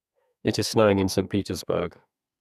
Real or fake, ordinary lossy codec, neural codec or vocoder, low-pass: fake; none; codec, 32 kHz, 1.9 kbps, SNAC; 14.4 kHz